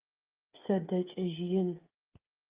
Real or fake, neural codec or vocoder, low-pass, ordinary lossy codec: fake; codec, 16 kHz, 8 kbps, FreqCodec, smaller model; 3.6 kHz; Opus, 32 kbps